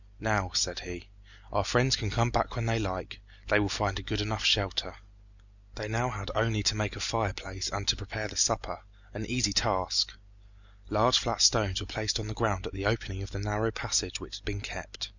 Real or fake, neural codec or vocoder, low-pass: real; none; 7.2 kHz